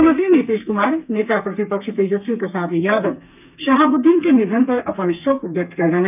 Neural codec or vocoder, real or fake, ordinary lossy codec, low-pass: codec, 32 kHz, 1.9 kbps, SNAC; fake; none; 3.6 kHz